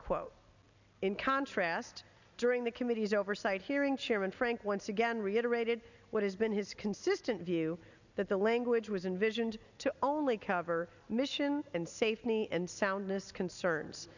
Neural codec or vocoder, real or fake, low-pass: none; real; 7.2 kHz